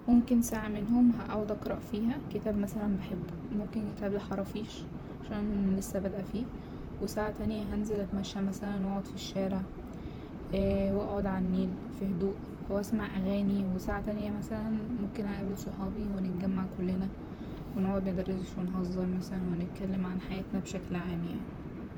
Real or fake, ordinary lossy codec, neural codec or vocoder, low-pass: fake; none; vocoder, 44.1 kHz, 128 mel bands, Pupu-Vocoder; none